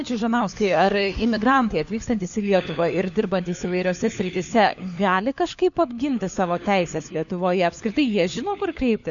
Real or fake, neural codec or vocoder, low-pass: fake; codec, 16 kHz, 4 kbps, FunCodec, trained on LibriTTS, 50 frames a second; 7.2 kHz